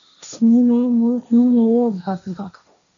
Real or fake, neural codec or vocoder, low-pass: fake; codec, 16 kHz, 1.1 kbps, Voila-Tokenizer; 7.2 kHz